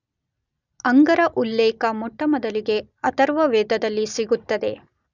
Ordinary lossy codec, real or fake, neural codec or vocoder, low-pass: none; real; none; 7.2 kHz